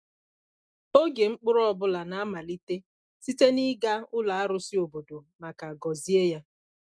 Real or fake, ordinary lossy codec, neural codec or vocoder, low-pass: real; none; none; none